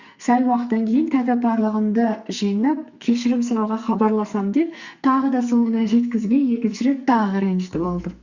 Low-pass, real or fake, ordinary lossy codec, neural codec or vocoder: 7.2 kHz; fake; Opus, 64 kbps; codec, 44.1 kHz, 2.6 kbps, SNAC